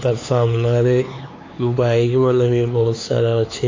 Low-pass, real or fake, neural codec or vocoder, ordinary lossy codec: 7.2 kHz; fake; codec, 16 kHz, 4 kbps, X-Codec, HuBERT features, trained on LibriSpeech; AAC, 32 kbps